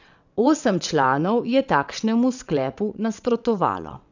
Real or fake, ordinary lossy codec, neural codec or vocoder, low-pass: fake; none; vocoder, 22.05 kHz, 80 mel bands, WaveNeXt; 7.2 kHz